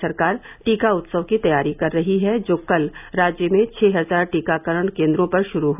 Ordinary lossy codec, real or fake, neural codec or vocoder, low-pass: none; real; none; 3.6 kHz